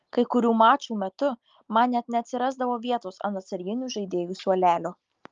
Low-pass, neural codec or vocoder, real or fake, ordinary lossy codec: 7.2 kHz; none; real; Opus, 32 kbps